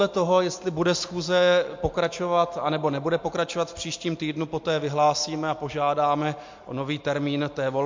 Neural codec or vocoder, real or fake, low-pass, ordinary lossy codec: none; real; 7.2 kHz; MP3, 48 kbps